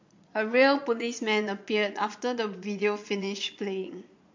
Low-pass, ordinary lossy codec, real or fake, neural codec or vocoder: 7.2 kHz; MP3, 48 kbps; fake; vocoder, 22.05 kHz, 80 mel bands, Vocos